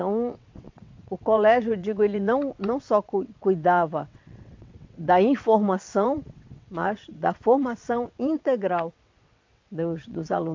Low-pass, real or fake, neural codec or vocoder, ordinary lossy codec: 7.2 kHz; fake; vocoder, 22.05 kHz, 80 mel bands, WaveNeXt; MP3, 48 kbps